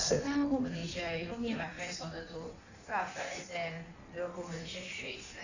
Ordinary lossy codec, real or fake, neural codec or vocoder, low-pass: none; fake; codec, 16 kHz in and 24 kHz out, 1.1 kbps, FireRedTTS-2 codec; 7.2 kHz